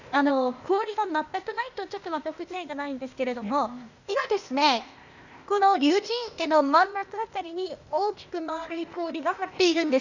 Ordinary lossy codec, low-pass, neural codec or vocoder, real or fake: none; 7.2 kHz; codec, 16 kHz, 0.8 kbps, ZipCodec; fake